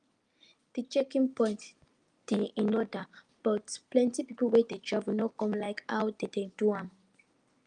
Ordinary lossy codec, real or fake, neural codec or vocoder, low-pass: Opus, 32 kbps; real; none; 9.9 kHz